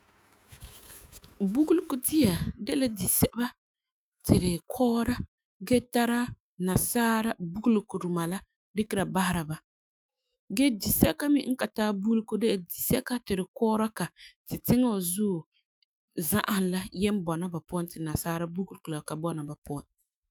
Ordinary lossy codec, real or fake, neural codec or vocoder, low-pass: none; fake; autoencoder, 48 kHz, 128 numbers a frame, DAC-VAE, trained on Japanese speech; none